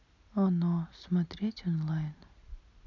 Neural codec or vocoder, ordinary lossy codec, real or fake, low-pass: none; none; real; 7.2 kHz